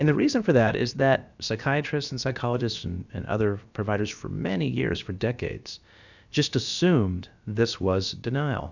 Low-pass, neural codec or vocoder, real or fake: 7.2 kHz; codec, 16 kHz, about 1 kbps, DyCAST, with the encoder's durations; fake